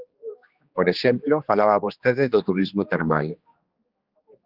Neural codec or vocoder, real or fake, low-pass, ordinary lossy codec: codec, 16 kHz, 2 kbps, X-Codec, HuBERT features, trained on general audio; fake; 5.4 kHz; Opus, 32 kbps